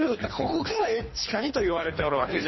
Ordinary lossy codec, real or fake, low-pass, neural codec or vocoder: MP3, 24 kbps; fake; 7.2 kHz; codec, 24 kHz, 3 kbps, HILCodec